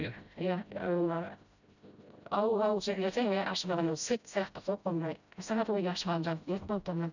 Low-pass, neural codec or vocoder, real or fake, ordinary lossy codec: 7.2 kHz; codec, 16 kHz, 0.5 kbps, FreqCodec, smaller model; fake; none